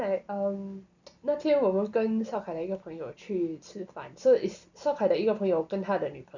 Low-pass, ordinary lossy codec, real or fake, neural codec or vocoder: 7.2 kHz; none; real; none